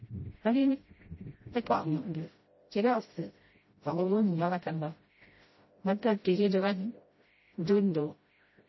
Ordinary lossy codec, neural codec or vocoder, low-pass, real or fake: MP3, 24 kbps; codec, 16 kHz, 0.5 kbps, FreqCodec, smaller model; 7.2 kHz; fake